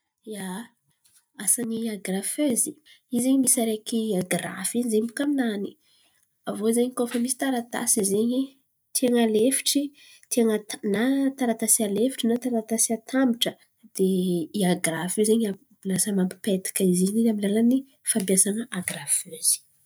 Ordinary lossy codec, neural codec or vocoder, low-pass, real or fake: none; none; none; real